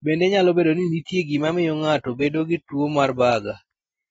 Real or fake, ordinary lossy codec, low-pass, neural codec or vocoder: real; AAC, 24 kbps; 19.8 kHz; none